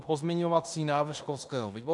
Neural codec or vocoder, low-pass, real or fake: codec, 16 kHz in and 24 kHz out, 0.9 kbps, LongCat-Audio-Codec, fine tuned four codebook decoder; 10.8 kHz; fake